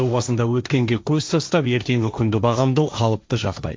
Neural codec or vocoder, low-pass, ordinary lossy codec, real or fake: codec, 16 kHz, 1.1 kbps, Voila-Tokenizer; none; none; fake